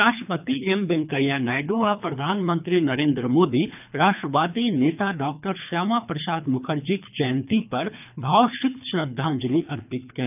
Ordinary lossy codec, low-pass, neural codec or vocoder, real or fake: none; 3.6 kHz; codec, 24 kHz, 3 kbps, HILCodec; fake